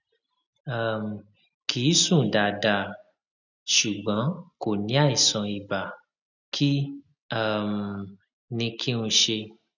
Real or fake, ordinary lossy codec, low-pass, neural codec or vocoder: real; none; 7.2 kHz; none